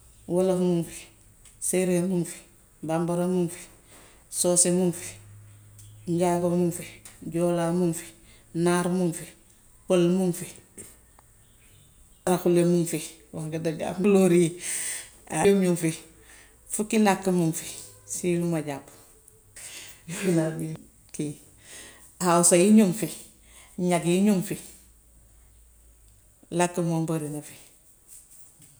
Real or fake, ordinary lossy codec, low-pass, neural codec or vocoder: real; none; none; none